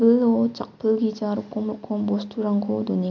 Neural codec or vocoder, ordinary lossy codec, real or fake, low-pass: none; MP3, 64 kbps; real; 7.2 kHz